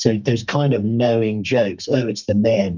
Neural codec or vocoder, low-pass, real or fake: codec, 32 kHz, 1.9 kbps, SNAC; 7.2 kHz; fake